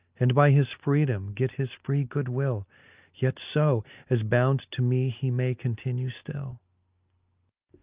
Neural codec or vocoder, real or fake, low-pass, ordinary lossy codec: none; real; 3.6 kHz; Opus, 24 kbps